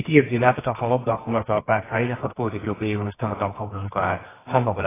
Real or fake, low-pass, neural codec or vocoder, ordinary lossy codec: fake; 3.6 kHz; codec, 24 kHz, 0.9 kbps, WavTokenizer, medium music audio release; AAC, 16 kbps